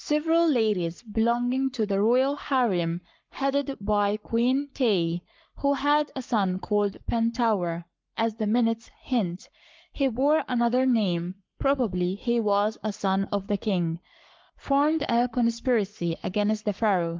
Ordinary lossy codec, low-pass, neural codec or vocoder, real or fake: Opus, 24 kbps; 7.2 kHz; codec, 44.1 kHz, 7.8 kbps, Pupu-Codec; fake